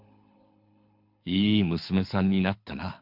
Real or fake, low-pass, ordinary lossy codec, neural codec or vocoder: fake; 5.4 kHz; MP3, 48 kbps; codec, 24 kHz, 6 kbps, HILCodec